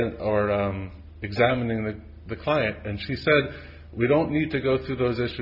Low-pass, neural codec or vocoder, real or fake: 5.4 kHz; none; real